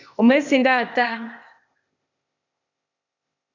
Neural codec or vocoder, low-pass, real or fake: codec, 16 kHz, 0.8 kbps, ZipCodec; 7.2 kHz; fake